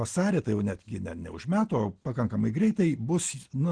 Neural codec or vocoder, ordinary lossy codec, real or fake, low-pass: none; Opus, 16 kbps; real; 9.9 kHz